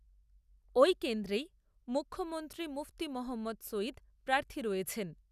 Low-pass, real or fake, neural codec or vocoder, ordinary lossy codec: 14.4 kHz; real; none; none